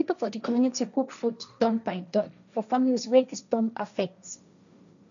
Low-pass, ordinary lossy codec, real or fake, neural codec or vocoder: 7.2 kHz; none; fake; codec, 16 kHz, 1.1 kbps, Voila-Tokenizer